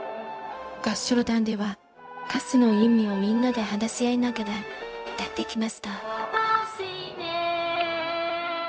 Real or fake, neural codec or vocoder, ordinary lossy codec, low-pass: fake; codec, 16 kHz, 0.4 kbps, LongCat-Audio-Codec; none; none